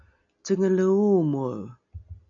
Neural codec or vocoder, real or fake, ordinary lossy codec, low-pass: none; real; MP3, 96 kbps; 7.2 kHz